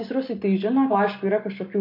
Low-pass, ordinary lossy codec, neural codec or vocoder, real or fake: 5.4 kHz; MP3, 32 kbps; none; real